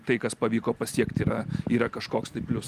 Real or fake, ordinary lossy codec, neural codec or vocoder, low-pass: real; Opus, 32 kbps; none; 14.4 kHz